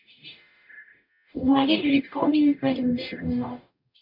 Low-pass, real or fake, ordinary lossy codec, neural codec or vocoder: 5.4 kHz; fake; MP3, 48 kbps; codec, 44.1 kHz, 0.9 kbps, DAC